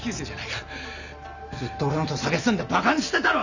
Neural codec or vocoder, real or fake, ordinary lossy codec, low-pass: none; real; none; 7.2 kHz